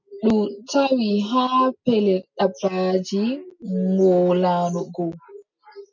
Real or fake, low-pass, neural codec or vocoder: real; 7.2 kHz; none